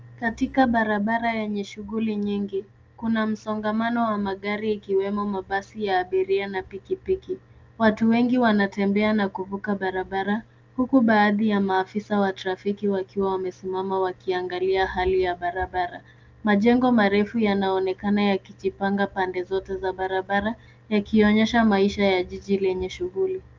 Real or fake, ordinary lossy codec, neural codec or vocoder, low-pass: real; Opus, 24 kbps; none; 7.2 kHz